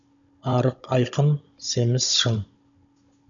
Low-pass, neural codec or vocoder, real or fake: 7.2 kHz; codec, 16 kHz, 16 kbps, FunCodec, trained on Chinese and English, 50 frames a second; fake